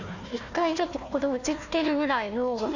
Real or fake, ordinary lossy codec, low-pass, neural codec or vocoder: fake; none; 7.2 kHz; codec, 16 kHz, 1 kbps, FunCodec, trained on Chinese and English, 50 frames a second